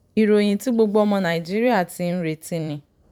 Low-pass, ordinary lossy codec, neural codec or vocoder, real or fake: 19.8 kHz; none; vocoder, 44.1 kHz, 128 mel bands every 512 samples, BigVGAN v2; fake